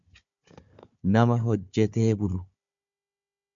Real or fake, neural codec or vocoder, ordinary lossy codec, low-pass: fake; codec, 16 kHz, 4 kbps, FunCodec, trained on Chinese and English, 50 frames a second; MP3, 64 kbps; 7.2 kHz